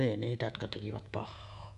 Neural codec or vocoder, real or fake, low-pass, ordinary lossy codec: codec, 24 kHz, 3.1 kbps, DualCodec; fake; 10.8 kHz; none